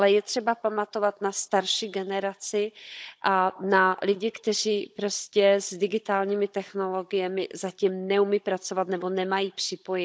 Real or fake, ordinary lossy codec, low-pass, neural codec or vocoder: fake; none; none; codec, 16 kHz, 16 kbps, FunCodec, trained on Chinese and English, 50 frames a second